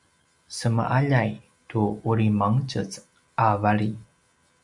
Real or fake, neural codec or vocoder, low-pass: real; none; 10.8 kHz